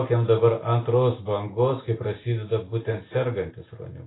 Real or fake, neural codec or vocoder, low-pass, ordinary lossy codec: real; none; 7.2 kHz; AAC, 16 kbps